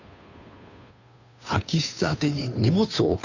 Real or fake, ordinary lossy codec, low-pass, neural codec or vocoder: fake; none; 7.2 kHz; codec, 16 kHz, 2 kbps, FunCodec, trained on Chinese and English, 25 frames a second